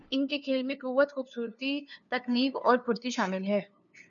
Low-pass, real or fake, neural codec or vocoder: 7.2 kHz; fake; codec, 16 kHz, 2 kbps, FunCodec, trained on LibriTTS, 25 frames a second